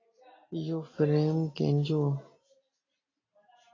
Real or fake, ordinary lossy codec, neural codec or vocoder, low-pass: real; AAC, 32 kbps; none; 7.2 kHz